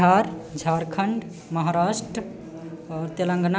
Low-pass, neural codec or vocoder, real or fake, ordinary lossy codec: none; none; real; none